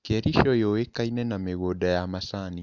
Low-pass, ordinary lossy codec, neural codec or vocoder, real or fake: 7.2 kHz; none; none; real